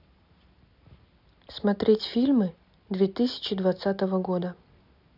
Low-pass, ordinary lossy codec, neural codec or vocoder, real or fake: 5.4 kHz; none; none; real